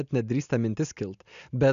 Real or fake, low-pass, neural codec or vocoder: real; 7.2 kHz; none